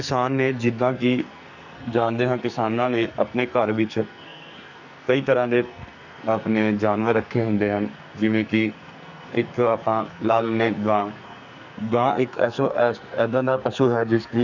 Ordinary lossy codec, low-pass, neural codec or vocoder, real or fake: none; 7.2 kHz; codec, 44.1 kHz, 2.6 kbps, SNAC; fake